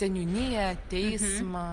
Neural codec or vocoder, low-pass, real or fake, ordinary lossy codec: none; 10.8 kHz; real; Opus, 32 kbps